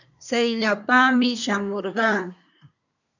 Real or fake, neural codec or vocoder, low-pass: fake; codec, 24 kHz, 1 kbps, SNAC; 7.2 kHz